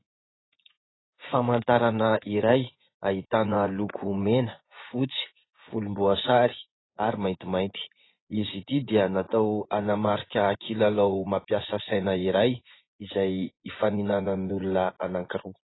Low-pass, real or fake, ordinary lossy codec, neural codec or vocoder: 7.2 kHz; fake; AAC, 16 kbps; vocoder, 24 kHz, 100 mel bands, Vocos